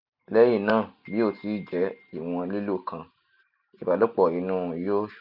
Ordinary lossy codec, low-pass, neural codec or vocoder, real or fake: none; 5.4 kHz; none; real